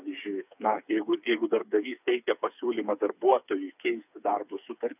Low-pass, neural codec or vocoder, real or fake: 3.6 kHz; codec, 16 kHz, 4 kbps, FreqCodec, smaller model; fake